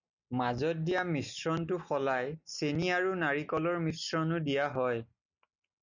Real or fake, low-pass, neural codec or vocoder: real; 7.2 kHz; none